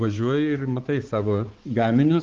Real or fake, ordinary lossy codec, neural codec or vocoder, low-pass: fake; Opus, 16 kbps; codec, 16 kHz, 4 kbps, X-Codec, HuBERT features, trained on balanced general audio; 7.2 kHz